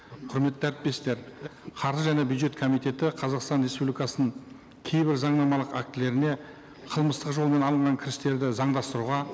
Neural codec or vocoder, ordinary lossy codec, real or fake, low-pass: none; none; real; none